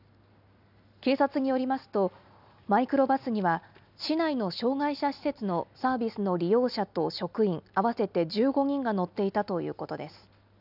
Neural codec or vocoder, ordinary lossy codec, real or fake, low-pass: none; none; real; 5.4 kHz